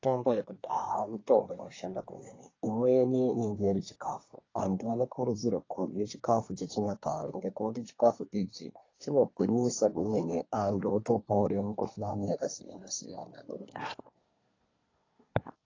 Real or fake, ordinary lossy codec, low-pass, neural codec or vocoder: fake; AAC, 32 kbps; 7.2 kHz; codec, 24 kHz, 1 kbps, SNAC